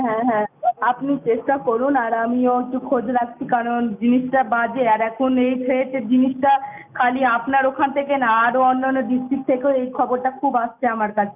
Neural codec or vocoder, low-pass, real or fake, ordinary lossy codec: none; 3.6 kHz; real; none